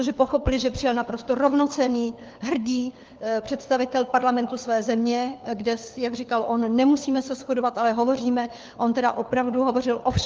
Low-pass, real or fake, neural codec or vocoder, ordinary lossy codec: 7.2 kHz; fake; codec, 16 kHz, 4 kbps, FunCodec, trained on LibriTTS, 50 frames a second; Opus, 24 kbps